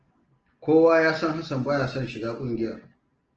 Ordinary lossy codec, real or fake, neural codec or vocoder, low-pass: Opus, 16 kbps; real; none; 7.2 kHz